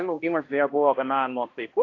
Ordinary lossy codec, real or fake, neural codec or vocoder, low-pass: AAC, 32 kbps; fake; codec, 16 kHz, 1 kbps, X-Codec, HuBERT features, trained on balanced general audio; 7.2 kHz